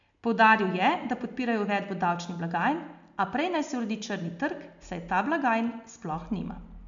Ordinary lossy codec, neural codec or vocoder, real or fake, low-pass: MP3, 64 kbps; none; real; 7.2 kHz